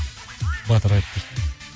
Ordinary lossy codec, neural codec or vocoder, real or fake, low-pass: none; none; real; none